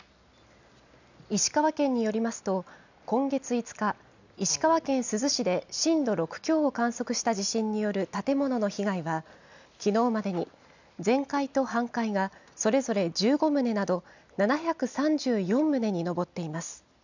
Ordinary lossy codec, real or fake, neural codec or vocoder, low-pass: none; real; none; 7.2 kHz